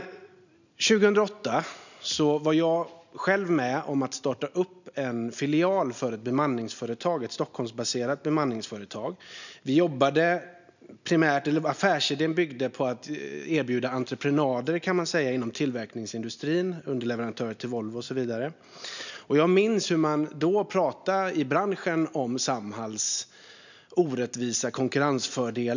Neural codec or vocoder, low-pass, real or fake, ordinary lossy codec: none; 7.2 kHz; real; none